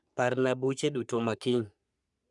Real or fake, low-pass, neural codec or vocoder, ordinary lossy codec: fake; 10.8 kHz; codec, 44.1 kHz, 3.4 kbps, Pupu-Codec; none